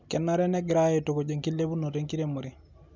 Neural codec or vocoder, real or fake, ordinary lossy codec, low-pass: none; real; none; 7.2 kHz